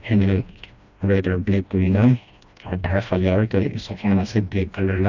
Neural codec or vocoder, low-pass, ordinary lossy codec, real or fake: codec, 16 kHz, 1 kbps, FreqCodec, smaller model; 7.2 kHz; none; fake